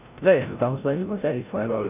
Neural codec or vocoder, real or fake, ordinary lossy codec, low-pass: codec, 16 kHz, 0.5 kbps, FreqCodec, larger model; fake; none; 3.6 kHz